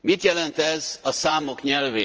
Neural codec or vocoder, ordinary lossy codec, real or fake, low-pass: vocoder, 44.1 kHz, 80 mel bands, Vocos; Opus, 24 kbps; fake; 7.2 kHz